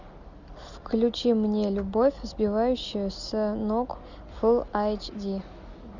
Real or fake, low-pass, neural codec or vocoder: real; 7.2 kHz; none